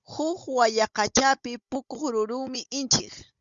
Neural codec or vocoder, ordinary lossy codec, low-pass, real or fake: codec, 16 kHz, 16 kbps, FunCodec, trained on Chinese and English, 50 frames a second; Opus, 64 kbps; 7.2 kHz; fake